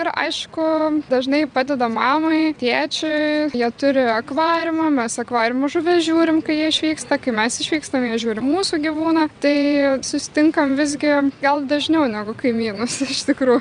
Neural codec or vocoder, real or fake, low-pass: vocoder, 22.05 kHz, 80 mel bands, WaveNeXt; fake; 9.9 kHz